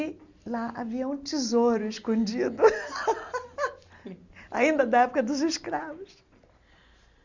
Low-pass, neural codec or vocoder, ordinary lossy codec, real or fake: 7.2 kHz; none; Opus, 64 kbps; real